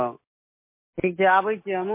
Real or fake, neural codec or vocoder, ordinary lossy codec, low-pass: real; none; MP3, 24 kbps; 3.6 kHz